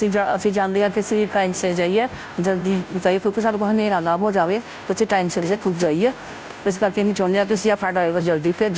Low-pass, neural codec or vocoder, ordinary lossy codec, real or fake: none; codec, 16 kHz, 0.5 kbps, FunCodec, trained on Chinese and English, 25 frames a second; none; fake